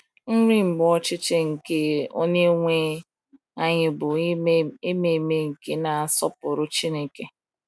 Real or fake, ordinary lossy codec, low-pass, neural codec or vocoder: real; none; none; none